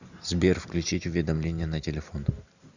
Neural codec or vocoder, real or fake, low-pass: none; real; 7.2 kHz